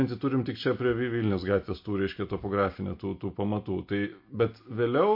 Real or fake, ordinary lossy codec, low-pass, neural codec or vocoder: real; MP3, 32 kbps; 5.4 kHz; none